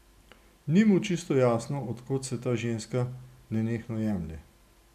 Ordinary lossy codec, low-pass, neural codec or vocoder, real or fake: none; 14.4 kHz; none; real